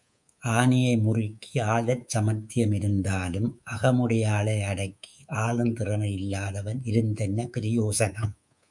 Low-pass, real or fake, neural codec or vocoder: 10.8 kHz; fake; codec, 24 kHz, 3.1 kbps, DualCodec